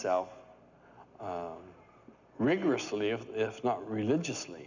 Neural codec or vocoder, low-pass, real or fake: none; 7.2 kHz; real